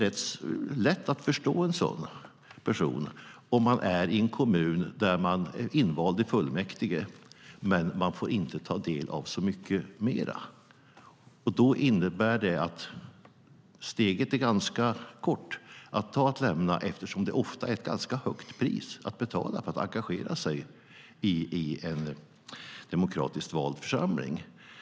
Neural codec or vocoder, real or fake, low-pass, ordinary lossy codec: none; real; none; none